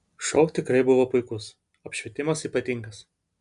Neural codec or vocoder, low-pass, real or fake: none; 10.8 kHz; real